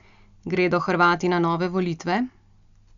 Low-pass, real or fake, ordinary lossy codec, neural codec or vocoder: 7.2 kHz; real; none; none